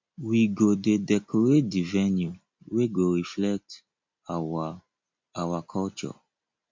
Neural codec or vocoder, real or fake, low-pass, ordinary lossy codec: none; real; 7.2 kHz; MP3, 48 kbps